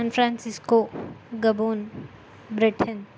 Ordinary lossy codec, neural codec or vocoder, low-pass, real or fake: none; none; none; real